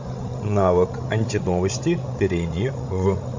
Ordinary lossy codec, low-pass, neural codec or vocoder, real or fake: MP3, 48 kbps; 7.2 kHz; codec, 16 kHz, 16 kbps, FreqCodec, larger model; fake